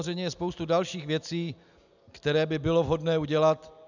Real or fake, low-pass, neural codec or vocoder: real; 7.2 kHz; none